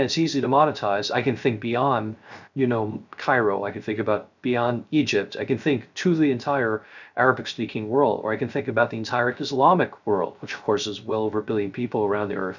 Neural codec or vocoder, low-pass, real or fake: codec, 16 kHz, 0.3 kbps, FocalCodec; 7.2 kHz; fake